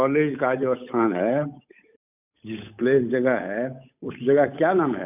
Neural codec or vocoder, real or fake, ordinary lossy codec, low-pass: codec, 16 kHz, 8 kbps, FunCodec, trained on Chinese and English, 25 frames a second; fake; none; 3.6 kHz